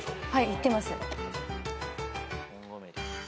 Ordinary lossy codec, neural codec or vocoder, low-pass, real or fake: none; none; none; real